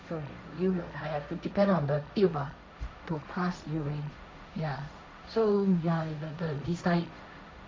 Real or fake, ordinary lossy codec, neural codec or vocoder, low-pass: fake; none; codec, 16 kHz, 1.1 kbps, Voila-Tokenizer; none